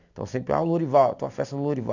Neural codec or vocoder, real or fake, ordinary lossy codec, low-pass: none; real; none; 7.2 kHz